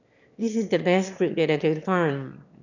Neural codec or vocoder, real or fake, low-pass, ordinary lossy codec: autoencoder, 22.05 kHz, a latent of 192 numbers a frame, VITS, trained on one speaker; fake; 7.2 kHz; none